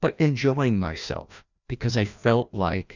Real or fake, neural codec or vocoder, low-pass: fake; codec, 16 kHz, 1 kbps, FreqCodec, larger model; 7.2 kHz